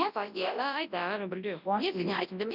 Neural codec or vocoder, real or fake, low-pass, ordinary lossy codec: codec, 24 kHz, 0.9 kbps, WavTokenizer, large speech release; fake; 5.4 kHz; none